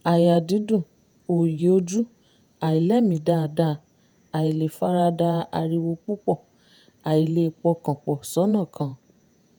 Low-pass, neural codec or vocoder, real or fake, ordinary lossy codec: none; vocoder, 48 kHz, 128 mel bands, Vocos; fake; none